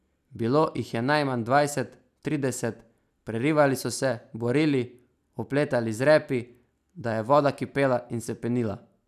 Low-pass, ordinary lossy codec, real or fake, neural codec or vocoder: 14.4 kHz; none; real; none